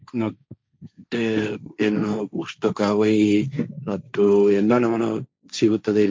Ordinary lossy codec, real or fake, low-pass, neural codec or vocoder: none; fake; none; codec, 16 kHz, 1.1 kbps, Voila-Tokenizer